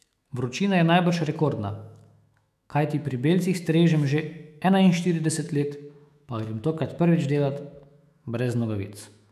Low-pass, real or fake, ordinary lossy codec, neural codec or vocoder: 14.4 kHz; fake; none; autoencoder, 48 kHz, 128 numbers a frame, DAC-VAE, trained on Japanese speech